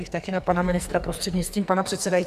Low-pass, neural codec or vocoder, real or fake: 14.4 kHz; codec, 44.1 kHz, 2.6 kbps, SNAC; fake